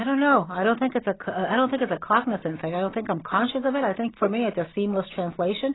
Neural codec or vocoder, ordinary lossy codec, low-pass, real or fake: none; AAC, 16 kbps; 7.2 kHz; real